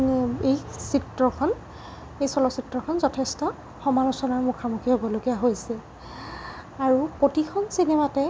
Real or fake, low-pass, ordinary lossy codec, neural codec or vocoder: real; none; none; none